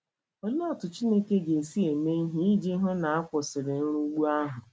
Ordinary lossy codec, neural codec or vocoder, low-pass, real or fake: none; none; none; real